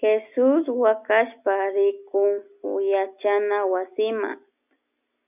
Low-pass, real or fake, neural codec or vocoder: 3.6 kHz; real; none